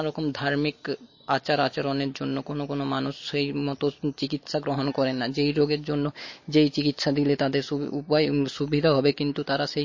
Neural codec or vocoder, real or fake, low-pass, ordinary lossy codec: none; real; 7.2 kHz; MP3, 32 kbps